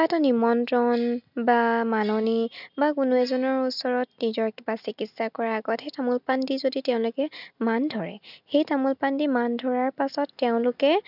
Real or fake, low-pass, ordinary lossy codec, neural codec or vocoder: real; 5.4 kHz; none; none